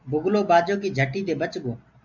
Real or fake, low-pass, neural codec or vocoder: real; 7.2 kHz; none